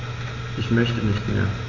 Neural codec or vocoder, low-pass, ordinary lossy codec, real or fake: none; 7.2 kHz; none; real